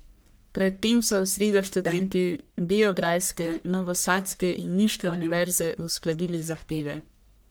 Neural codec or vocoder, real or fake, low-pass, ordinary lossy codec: codec, 44.1 kHz, 1.7 kbps, Pupu-Codec; fake; none; none